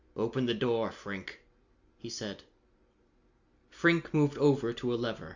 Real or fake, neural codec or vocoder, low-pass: real; none; 7.2 kHz